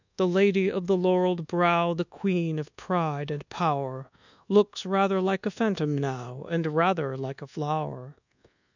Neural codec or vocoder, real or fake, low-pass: codec, 24 kHz, 1.2 kbps, DualCodec; fake; 7.2 kHz